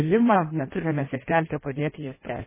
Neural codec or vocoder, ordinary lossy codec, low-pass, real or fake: codec, 16 kHz in and 24 kHz out, 0.6 kbps, FireRedTTS-2 codec; MP3, 16 kbps; 3.6 kHz; fake